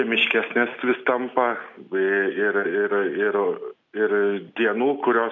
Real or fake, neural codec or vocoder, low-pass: real; none; 7.2 kHz